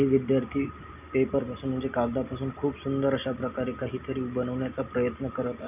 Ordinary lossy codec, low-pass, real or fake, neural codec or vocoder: none; 3.6 kHz; real; none